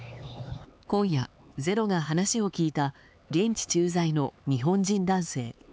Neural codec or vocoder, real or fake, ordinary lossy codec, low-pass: codec, 16 kHz, 4 kbps, X-Codec, HuBERT features, trained on LibriSpeech; fake; none; none